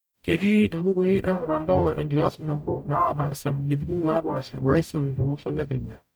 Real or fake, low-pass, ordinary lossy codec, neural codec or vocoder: fake; none; none; codec, 44.1 kHz, 0.9 kbps, DAC